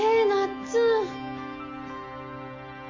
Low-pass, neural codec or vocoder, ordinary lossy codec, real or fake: 7.2 kHz; none; MP3, 64 kbps; real